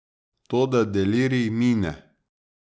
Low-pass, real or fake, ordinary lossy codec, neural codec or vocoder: none; real; none; none